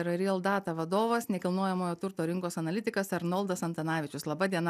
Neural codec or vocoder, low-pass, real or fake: none; 14.4 kHz; real